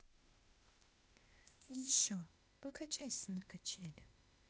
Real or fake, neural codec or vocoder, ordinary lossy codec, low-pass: fake; codec, 16 kHz, 0.8 kbps, ZipCodec; none; none